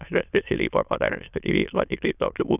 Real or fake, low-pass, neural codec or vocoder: fake; 3.6 kHz; autoencoder, 22.05 kHz, a latent of 192 numbers a frame, VITS, trained on many speakers